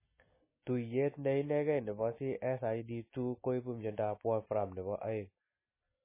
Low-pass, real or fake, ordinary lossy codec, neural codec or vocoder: 3.6 kHz; real; MP3, 16 kbps; none